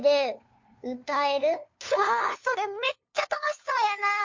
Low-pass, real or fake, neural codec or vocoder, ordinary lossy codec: 7.2 kHz; fake; codec, 16 kHz, 2 kbps, FunCodec, trained on LibriTTS, 25 frames a second; MP3, 48 kbps